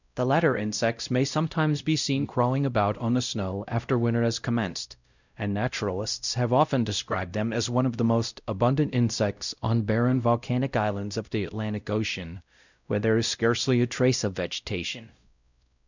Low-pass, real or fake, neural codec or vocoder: 7.2 kHz; fake; codec, 16 kHz, 0.5 kbps, X-Codec, WavLM features, trained on Multilingual LibriSpeech